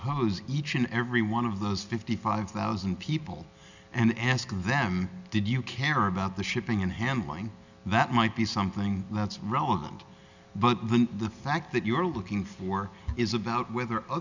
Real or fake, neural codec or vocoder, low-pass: real; none; 7.2 kHz